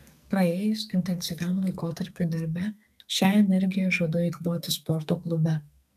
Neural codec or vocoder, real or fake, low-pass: codec, 44.1 kHz, 2.6 kbps, SNAC; fake; 14.4 kHz